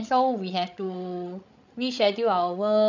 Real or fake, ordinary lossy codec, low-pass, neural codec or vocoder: fake; none; 7.2 kHz; codec, 16 kHz, 16 kbps, FreqCodec, larger model